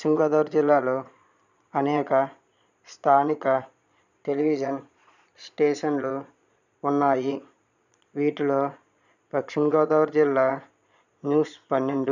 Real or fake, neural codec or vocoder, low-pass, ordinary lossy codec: fake; vocoder, 22.05 kHz, 80 mel bands, Vocos; 7.2 kHz; none